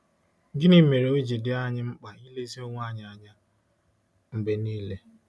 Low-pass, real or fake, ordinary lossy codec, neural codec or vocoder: none; real; none; none